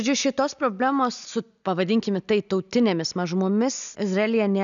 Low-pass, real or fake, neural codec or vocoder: 7.2 kHz; real; none